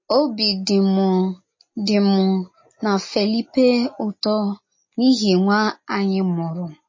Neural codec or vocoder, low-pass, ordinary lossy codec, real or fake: none; 7.2 kHz; MP3, 32 kbps; real